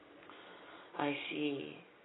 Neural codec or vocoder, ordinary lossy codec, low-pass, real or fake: none; AAC, 16 kbps; 7.2 kHz; real